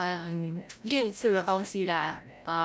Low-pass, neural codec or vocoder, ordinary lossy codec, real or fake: none; codec, 16 kHz, 0.5 kbps, FreqCodec, larger model; none; fake